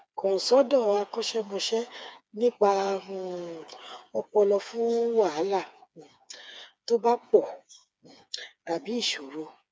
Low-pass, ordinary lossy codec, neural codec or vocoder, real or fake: none; none; codec, 16 kHz, 4 kbps, FreqCodec, smaller model; fake